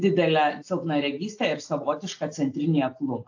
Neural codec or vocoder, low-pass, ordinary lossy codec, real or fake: none; 7.2 kHz; AAC, 48 kbps; real